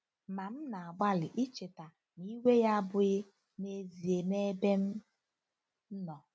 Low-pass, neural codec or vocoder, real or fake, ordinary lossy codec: none; none; real; none